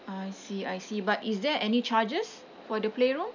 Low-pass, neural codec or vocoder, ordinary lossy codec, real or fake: 7.2 kHz; none; none; real